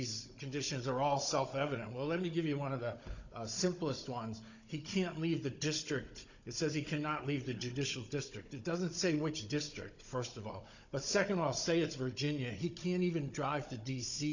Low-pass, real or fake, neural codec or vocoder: 7.2 kHz; fake; codec, 16 kHz, 16 kbps, FunCodec, trained on Chinese and English, 50 frames a second